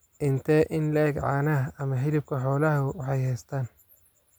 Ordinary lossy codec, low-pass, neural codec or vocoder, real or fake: none; none; none; real